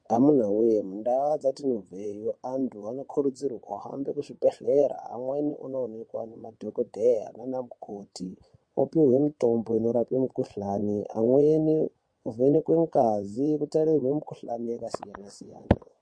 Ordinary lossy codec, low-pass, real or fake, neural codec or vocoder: MP3, 48 kbps; 9.9 kHz; fake; vocoder, 22.05 kHz, 80 mel bands, WaveNeXt